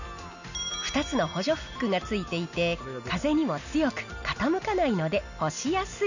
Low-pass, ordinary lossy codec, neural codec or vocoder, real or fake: 7.2 kHz; none; none; real